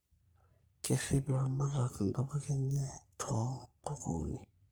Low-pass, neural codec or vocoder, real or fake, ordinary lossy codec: none; codec, 44.1 kHz, 3.4 kbps, Pupu-Codec; fake; none